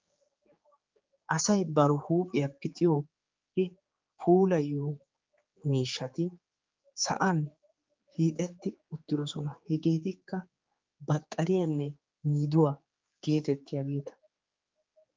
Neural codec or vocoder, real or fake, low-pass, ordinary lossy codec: codec, 16 kHz, 4 kbps, X-Codec, HuBERT features, trained on general audio; fake; 7.2 kHz; Opus, 32 kbps